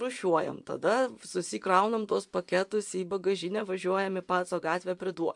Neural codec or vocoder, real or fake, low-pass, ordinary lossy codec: vocoder, 24 kHz, 100 mel bands, Vocos; fake; 10.8 kHz; MP3, 64 kbps